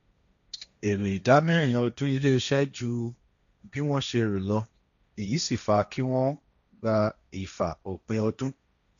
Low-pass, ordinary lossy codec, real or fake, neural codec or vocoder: 7.2 kHz; none; fake; codec, 16 kHz, 1.1 kbps, Voila-Tokenizer